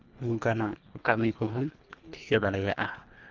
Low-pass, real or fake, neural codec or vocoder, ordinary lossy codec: 7.2 kHz; fake; codec, 24 kHz, 1.5 kbps, HILCodec; Opus, 32 kbps